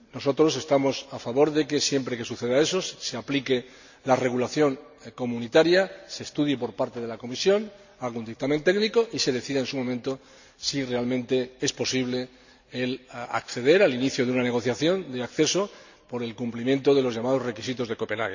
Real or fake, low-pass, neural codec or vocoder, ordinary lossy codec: real; 7.2 kHz; none; none